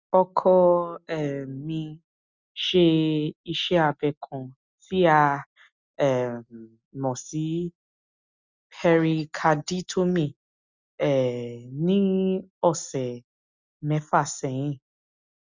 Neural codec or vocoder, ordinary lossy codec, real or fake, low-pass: none; Opus, 64 kbps; real; 7.2 kHz